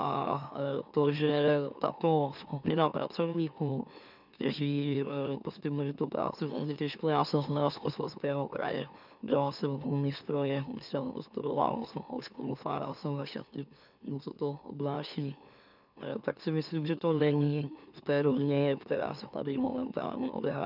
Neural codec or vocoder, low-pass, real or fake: autoencoder, 44.1 kHz, a latent of 192 numbers a frame, MeloTTS; 5.4 kHz; fake